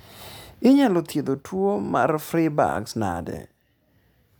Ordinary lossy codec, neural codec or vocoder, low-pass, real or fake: none; none; none; real